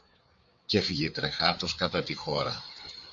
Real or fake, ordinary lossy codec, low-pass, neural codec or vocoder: fake; MP3, 64 kbps; 7.2 kHz; codec, 16 kHz, 4 kbps, FreqCodec, larger model